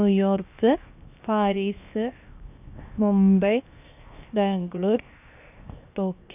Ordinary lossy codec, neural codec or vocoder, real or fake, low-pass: none; codec, 16 kHz, 0.7 kbps, FocalCodec; fake; 3.6 kHz